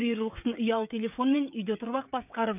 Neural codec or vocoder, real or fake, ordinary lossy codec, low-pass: codec, 16 kHz, 16 kbps, FreqCodec, smaller model; fake; AAC, 32 kbps; 3.6 kHz